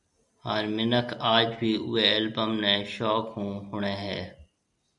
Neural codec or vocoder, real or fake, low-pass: none; real; 10.8 kHz